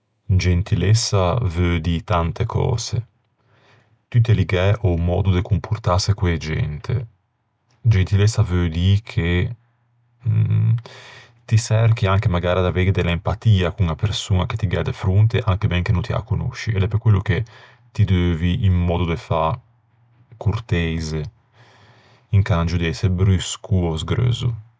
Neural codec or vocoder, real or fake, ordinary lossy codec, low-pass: none; real; none; none